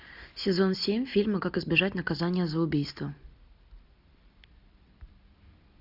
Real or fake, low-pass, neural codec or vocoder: real; 5.4 kHz; none